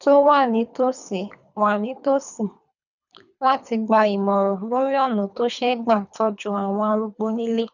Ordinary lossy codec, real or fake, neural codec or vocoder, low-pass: none; fake; codec, 24 kHz, 3 kbps, HILCodec; 7.2 kHz